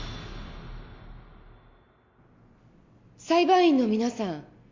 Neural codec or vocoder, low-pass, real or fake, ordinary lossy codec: none; 7.2 kHz; real; MP3, 48 kbps